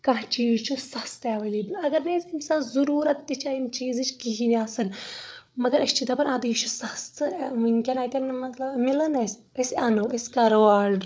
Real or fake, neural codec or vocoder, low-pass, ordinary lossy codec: fake; codec, 16 kHz, 8 kbps, FreqCodec, larger model; none; none